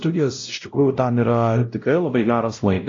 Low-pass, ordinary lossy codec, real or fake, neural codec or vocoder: 7.2 kHz; AAC, 48 kbps; fake; codec, 16 kHz, 0.5 kbps, X-Codec, WavLM features, trained on Multilingual LibriSpeech